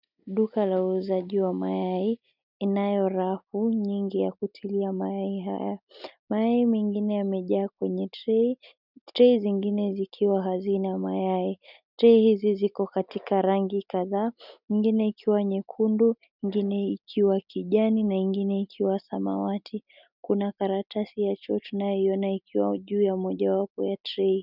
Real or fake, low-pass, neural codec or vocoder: real; 5.4 kHz; none